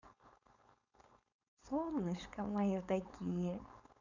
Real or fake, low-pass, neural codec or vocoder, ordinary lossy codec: fake; 7.2 kHz; codec, 16 kHz, 4.8 kbps, FACodec; none